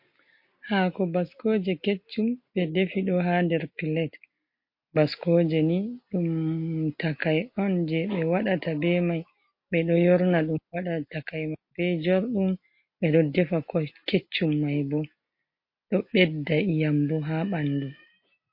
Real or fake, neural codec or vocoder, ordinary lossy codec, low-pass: real; none; MP3, 32 kbps; 5.4 kHz